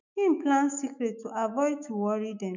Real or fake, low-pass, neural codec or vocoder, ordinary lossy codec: fake; 7.2 kHz; autoencoder, 48 kHz, 128 numbers a frame, DAC-VAE, trained on Japanese speech; none